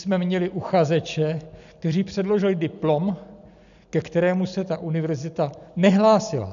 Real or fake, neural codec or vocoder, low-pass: real; none; 7.2 kHz